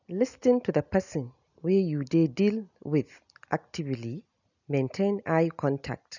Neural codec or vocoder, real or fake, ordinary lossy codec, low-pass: none; real; none; 7.2 kHz